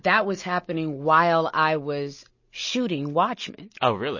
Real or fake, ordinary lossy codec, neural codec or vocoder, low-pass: real; MP3, 32 kbps; none; 7.2 kHz